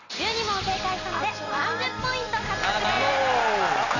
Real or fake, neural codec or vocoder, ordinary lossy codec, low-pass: real; none; none; 7.2 kHz